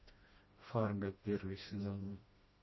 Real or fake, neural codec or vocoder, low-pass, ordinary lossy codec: fake; codec, 16 kHz, 1 kbps, FreqCodec, smaller model; 7.2 kHz; MP3, 24 kbps